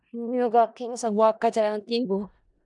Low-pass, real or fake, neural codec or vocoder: 10.8 kHz; fake; codec, 16 kHz in and 24 kHz out, 0.4 kbps, LongCat-Audio-Codec, four codebook decoder